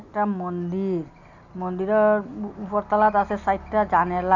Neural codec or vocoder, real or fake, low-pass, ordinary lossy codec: none; real; 7.2 kHz; none